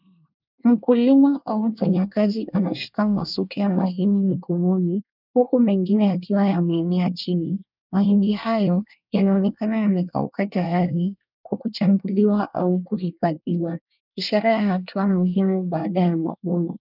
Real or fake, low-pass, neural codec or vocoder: fake; 5.4 kHz; codec, 24 kHz, 1 kbps, SNAC